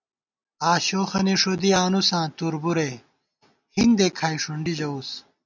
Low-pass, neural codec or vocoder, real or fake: 7.2 kHz; none; real